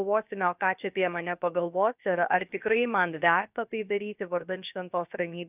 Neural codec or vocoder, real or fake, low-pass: codec, 16 kHz, about 1 kbps, DyCAST, with the encoder's durations; fake; 3.6 kHz